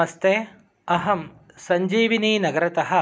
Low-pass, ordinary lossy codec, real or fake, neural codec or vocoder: none; none; real; none